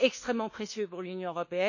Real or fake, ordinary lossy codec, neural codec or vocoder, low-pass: fake; none; codec, 24 kHz, 1.2 kbps, DualCodec; 7.2 kHz